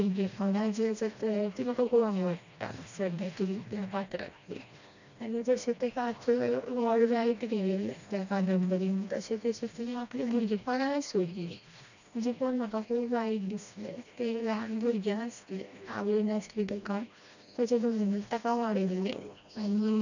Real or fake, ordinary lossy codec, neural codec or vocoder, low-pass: fake; none; codec, 16 kHz, 1 kbps, FreqCodec, smaller model; 7.2 kHz